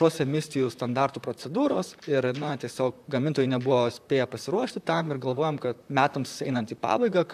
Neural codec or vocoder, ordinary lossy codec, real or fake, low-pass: vocoder, 44.1 kHz, 128 mel bands, Pupu-Vocoder; AAC, 96 kbps; fake; 14.4 kHz